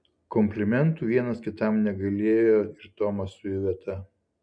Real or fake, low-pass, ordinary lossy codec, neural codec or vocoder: real; 9.9 kHz; MP3, 64 kbps; none